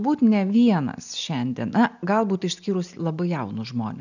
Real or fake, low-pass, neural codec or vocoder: real; 7.2 kHz; none